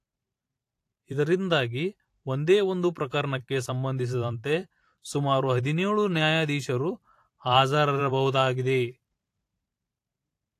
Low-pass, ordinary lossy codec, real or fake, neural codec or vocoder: 14.4 kHz; AAC, 64 kbps; fake; vocoder, 44.1 kHz, 128 mel bands every 512 samples, BigVGAN v2